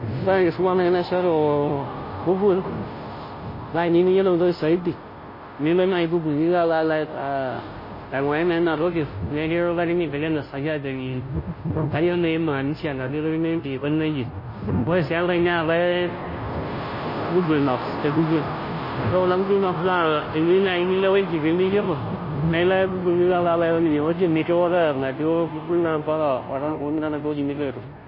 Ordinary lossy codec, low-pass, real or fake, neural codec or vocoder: MP3, 24 kbps; 5.4 kHz; fake; codec, 16 kHz, 0.5 kbps, FunCodec, trained on Chinese and English, 25 frames a second